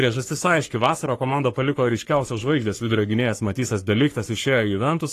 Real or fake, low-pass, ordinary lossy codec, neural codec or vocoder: fake; 14.4 kHz; AAC, 48 kbps; codec, 44.1 kHz, 3.4 kbps, Pupu-Codec